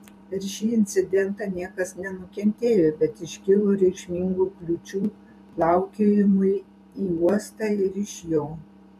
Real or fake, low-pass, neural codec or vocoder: fake; 14.4 kHz; vocoder, 44.1 kHz, 128 mel bands every 512 samples, BigVGAN v2